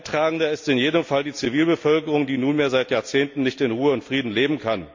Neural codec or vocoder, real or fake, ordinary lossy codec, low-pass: none; real; MP3, 48 kbps; 7.2 kHz